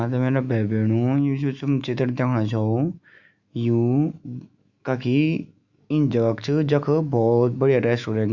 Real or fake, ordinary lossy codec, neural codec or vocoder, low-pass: fake; Opus, 64 kbps; autoencoder, 48 kHz, 128 numbers a frame, DAC-VAE, trained on Japanese speech; 7.2 kHz